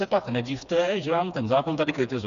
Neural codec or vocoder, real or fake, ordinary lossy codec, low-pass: codec, 16 kHz, 2 kbps, FreqCodec, smaller model; fake; Opus, 64 kbps; 7.2 kHz